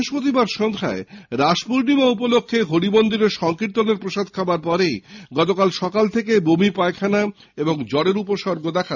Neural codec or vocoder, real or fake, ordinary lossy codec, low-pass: none; real; none; 7.2 kHz